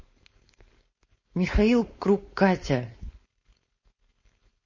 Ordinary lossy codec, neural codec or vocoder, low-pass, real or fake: MP3, 32 kbps; codec, 16 kHz, 4.8 kbps, FACodec; 7.2 kHz; fake